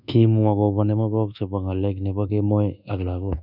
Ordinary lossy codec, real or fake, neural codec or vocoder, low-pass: none; fake; codec, 24 kHz, 1.2 kbps, DualCodec; 5.4 kHz